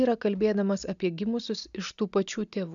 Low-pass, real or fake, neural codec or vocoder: 7.2 kHz; real; none